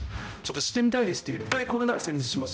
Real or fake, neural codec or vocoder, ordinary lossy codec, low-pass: fake; codec, 16 kHz, 0.5 kbps, X-Codec, HuBERT features, trained on balanced general audio; none; none